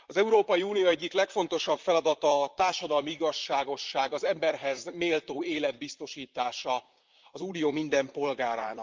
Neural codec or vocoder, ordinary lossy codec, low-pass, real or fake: vocoder, 44.1 kHz, 128 mel bands, Pupu-Vocoder; Opus, 24 kbps; 7.2 kHz; fake